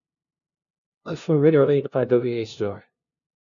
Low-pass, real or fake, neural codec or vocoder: 7.2 kHz; fake; codec, 16 kHz, 0.5 kbps, FunCodec, trained on LibriTTS, 25 frames a second